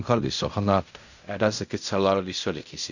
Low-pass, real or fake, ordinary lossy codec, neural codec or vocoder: 7.2 kHz; fake; none; codec, 16 kHz in and 24 kHz out, 0.4 kbps, LongCat-Audio-Codec, fine tuned four codebook decoder